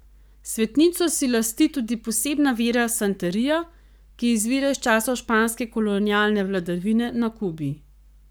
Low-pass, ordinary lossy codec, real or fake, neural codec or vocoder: none; none; fake; codec, 44.1 kHz, 7.8 kbps, Pupu-Codec